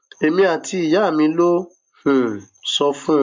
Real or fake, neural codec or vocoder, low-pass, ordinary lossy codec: real; none; 7.2 kHz; MP3, 64 kbps